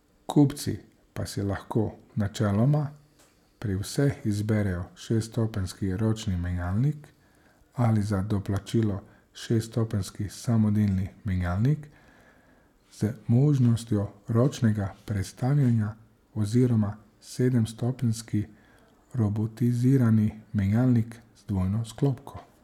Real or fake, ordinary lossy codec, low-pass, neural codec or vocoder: real; none; 19.8 kHz; none